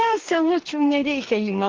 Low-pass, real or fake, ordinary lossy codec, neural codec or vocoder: 7.2 kHz; fake; Opus, 16 kbps; codec, 44.1 kHz, 2.6 kbps, DAC